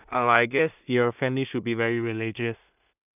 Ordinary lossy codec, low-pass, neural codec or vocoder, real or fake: none; 3.6 kHz; codec, 16 kHz in and 24 kHz out, 0.4 kbps, LongCat-Audio-Codec, two codebook decoder; fake